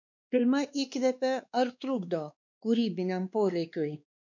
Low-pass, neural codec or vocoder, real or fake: 7.2 kHz; codec, 16 kHz, 2 kbps, X-Codec, WavLM features, trained on Multilingual LibriSpeech; fake